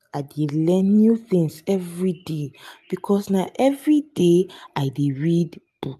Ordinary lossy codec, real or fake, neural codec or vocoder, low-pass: AAC, 96 kbps; real; none; 14.4 kHz